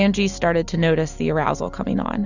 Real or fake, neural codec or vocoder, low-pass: real; none; 7.2 kHz